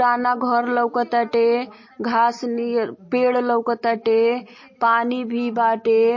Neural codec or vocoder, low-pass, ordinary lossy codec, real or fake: none; 7.2 kHz; MP3, 32 kbps; real